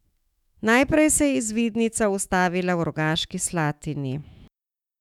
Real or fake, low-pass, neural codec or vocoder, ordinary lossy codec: fake; 19.8 kHz; autoencoder, 48 kHz, 128 numbers a frame, DAC-VAE, trained on Japanese speech; none